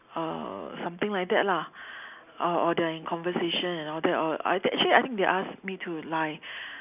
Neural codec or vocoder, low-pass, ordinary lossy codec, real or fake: none; 3.6 kHz; none; real